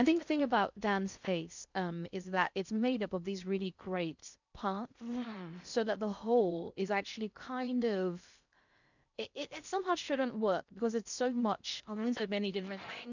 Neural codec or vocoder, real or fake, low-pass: codec, 16 kHz in and 24 kHz out, 0.6 kbps, FocalCodec, streaming, 2048 codes; fake; 7.2 kHz